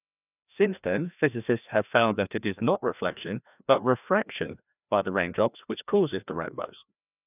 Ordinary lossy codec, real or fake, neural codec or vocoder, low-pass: none; fake; codec, 16 kHz, 1 kbps, FreqCodec, larger model; 3.6 kHz